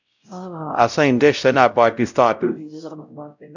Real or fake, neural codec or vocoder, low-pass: fake; codec, 16 kHz, 0.5 kbps, X-Codec, WavLM features, trained on Multilingual LibriSpeech; 7.2 kHz